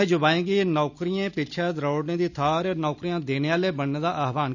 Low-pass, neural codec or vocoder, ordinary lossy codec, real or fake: 7.2 kHz; none; none; real